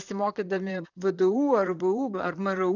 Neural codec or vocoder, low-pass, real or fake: vocoder, 44.1 kHz, 128 mel bands, Pupu-Vocoder; 7.2 kHz; fake